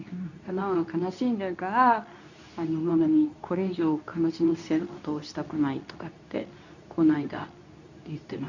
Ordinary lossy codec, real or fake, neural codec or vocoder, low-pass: none; fake; codec, 24 kHz, 0.9 kbps, WavTokenizer, medium speech release version 2; 7.2 kHz